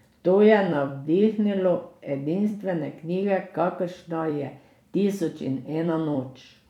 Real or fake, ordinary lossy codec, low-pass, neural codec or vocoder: fake; none; 19.8 kHz; vocoder, 44.1 kHz, 128 mel bands every 256 samples, BigVGAN v2